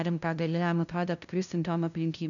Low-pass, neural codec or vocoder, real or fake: 7.2 kHz; codec, 16 kHz, 0.5 kbps, FunCodec, trained on LibriTTS, 25 frames a second; fake